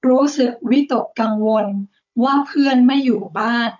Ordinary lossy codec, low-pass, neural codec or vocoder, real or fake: none; 7.2 kHz; codec, 16 kHz, 16 kbps, FunCodec, trained on Chinese and English, 50 frames a second; fake